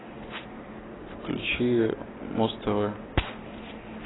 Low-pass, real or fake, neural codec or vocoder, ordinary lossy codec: 7.2 kHz; real; none; AAC, 16 kbps